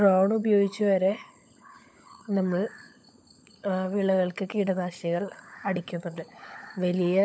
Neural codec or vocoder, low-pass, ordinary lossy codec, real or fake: codec, 16 kHz, 16 kbps, FunCodec, trained on LibriTTS, 50 frames a second; none; none; fake